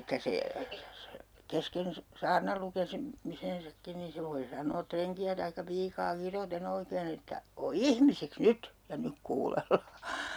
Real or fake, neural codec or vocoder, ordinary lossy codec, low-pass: real; none; none; none